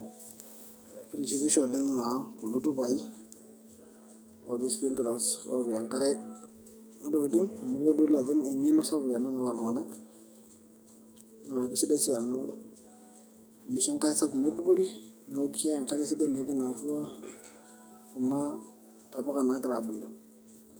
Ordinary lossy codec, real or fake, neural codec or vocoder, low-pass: none; fake; codec, 44.1 kHz, 2.6 kbps, SNAC; none